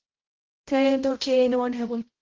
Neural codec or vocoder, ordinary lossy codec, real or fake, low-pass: codec, 16 kHz, 0.5 kbps, X-Codec, HuBERT features, trained on balanced general audio; Opus, 16 kbps; fake; 7.2 kHz